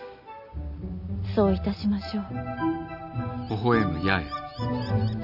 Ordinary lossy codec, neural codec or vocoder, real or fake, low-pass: none; none; real; 5.4 kHz